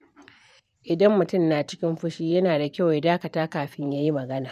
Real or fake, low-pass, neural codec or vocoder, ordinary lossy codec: fake; 14.4 kHz; vocoder, 44.1 kHz, 128 mel bands every 512 samples, BigVGAN v2; none